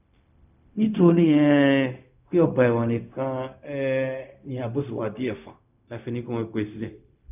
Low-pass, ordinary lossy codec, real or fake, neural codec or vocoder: 3.6 kHz; none; fake; codec, 16 kHz, 0.4 kbps, LongCat-Audio-Codec